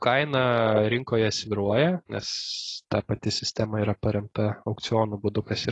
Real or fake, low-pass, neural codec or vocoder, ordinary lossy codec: real; 10.8 kHz; none; AAC, 32 kbps